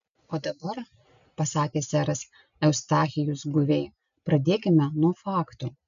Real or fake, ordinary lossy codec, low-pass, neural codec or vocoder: real; MP3, 96 kbps; 7.2 kHz; none